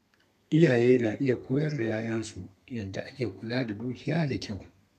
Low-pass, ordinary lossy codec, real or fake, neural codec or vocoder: 14.4 kHz; none; fake; codec, 32 kHz, 1.9 kbps, SNAC